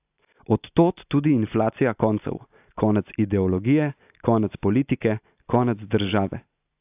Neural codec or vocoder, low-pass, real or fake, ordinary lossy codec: none; 3.6 kHz; real; AAC, 32 kbps